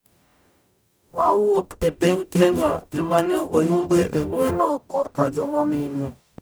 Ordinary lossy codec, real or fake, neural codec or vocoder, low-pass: none; fake; codec, 44.1 kHz, 0.9 kbps, DAC; none